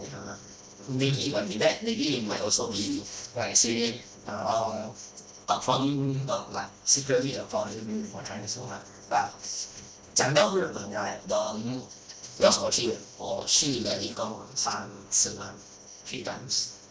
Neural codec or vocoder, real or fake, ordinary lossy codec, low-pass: codec, 16 kHz, 1 kbps, FreqCodec, smaller model; fake; none; none